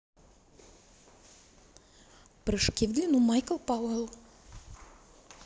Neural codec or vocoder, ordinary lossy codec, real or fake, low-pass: none; none; real; none